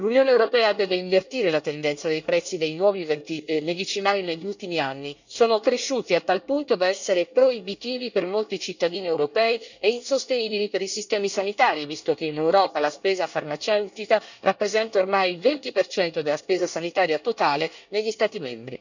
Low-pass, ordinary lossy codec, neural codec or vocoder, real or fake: 7.2 kHz; none; codec, 24 kHz, 1 kbps, SNAC; fake